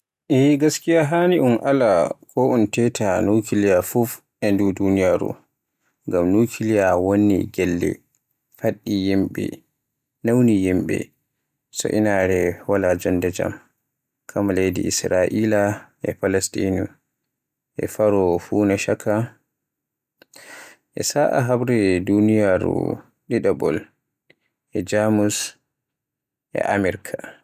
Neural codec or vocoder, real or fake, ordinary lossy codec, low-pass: none; real; none; 14.4 kHz